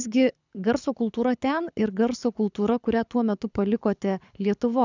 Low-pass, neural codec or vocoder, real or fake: 7.2 kHz; codec, 24 kHz, 6 kbps, HILCodec; fake